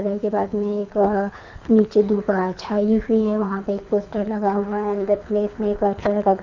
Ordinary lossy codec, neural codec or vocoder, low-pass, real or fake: none; codec, 24 kHz, 6 kbps, HILCodec; 7.2 kHz; fake